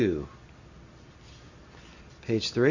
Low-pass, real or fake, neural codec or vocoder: 7.2 kHz; real; none